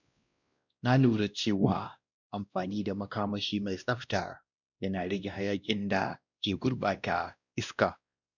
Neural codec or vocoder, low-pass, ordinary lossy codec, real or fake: codec, 16 kHz, 1 kbps, X-Codec, WavLM features, trained on Multilingual LibriSpeech; 7.2 kHz; none; fake